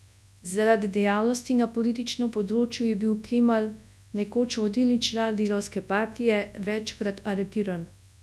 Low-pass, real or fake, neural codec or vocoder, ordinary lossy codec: none; fake; codec, 24 kHz, 0.9 kbps, WavTokenizer, large speech release; none